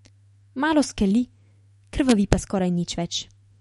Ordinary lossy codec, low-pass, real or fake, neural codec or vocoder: MP3, 48 kbps; 19.8 kHz; fake; autoencoder, 48 kHz, 128 numbers a frame, DAC-VAE, trained on Japanese speech